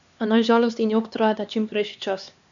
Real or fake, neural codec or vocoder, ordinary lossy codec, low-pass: fake; codec, 16 kHz, 2 kbps, X-Codec, HuBERT features, trained on LibriSpeech; none; 7.2 kHz